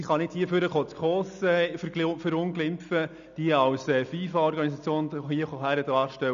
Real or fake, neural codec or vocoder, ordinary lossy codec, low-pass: real; none; none; 7.2 kHz